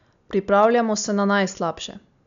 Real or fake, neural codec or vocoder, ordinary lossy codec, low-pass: real; none; none; 7.2 kHz